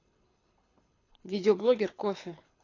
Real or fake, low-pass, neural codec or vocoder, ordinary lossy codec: fake; 7.2 kHz; codec, 24 kHz, 6 kbps, HILCodec; AAC, 32 kbps